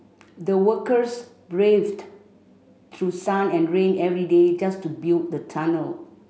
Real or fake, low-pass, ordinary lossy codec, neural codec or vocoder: real; none; none; none